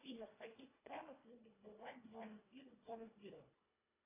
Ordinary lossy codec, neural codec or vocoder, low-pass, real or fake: AAC, 24 kbps; codec, 24 kHz, 1.5 kbps, HILCodec; 3.6 kHz; fake